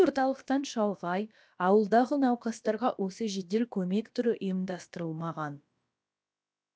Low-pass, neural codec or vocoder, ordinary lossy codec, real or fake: none; codec, 16 kHz, about 1 kbps, DyCAST, with the encoder's durations; none; fake